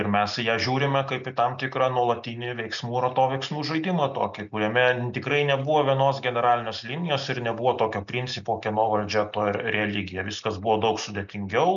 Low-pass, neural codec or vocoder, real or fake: 7.2 kHz; none; real